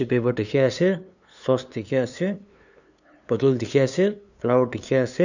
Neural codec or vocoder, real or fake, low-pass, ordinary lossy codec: codec, 16 kHz, 2 kbps, FunCodec, trained on LibriTTS, 25 frames a second; fake; 7.2 kHz; none